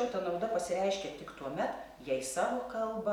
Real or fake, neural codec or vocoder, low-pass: real; none; 19.8 kHz